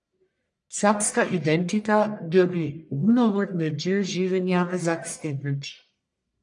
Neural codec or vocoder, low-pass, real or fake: codec, 44.1 kHz, 1.7 kbps, Pupu-Codec; 10.8 kHz; fake